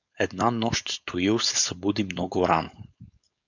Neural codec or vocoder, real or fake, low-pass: codec, 16 kHz, 4.8 kbps, FACodec; fake; 7.2 kHz